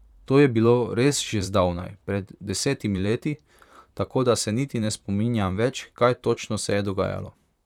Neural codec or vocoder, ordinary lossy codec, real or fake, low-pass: vocoder, 44.1 kHz, 128 mel bands, Pupu-Vocoder; none; fake; 19.8 kHz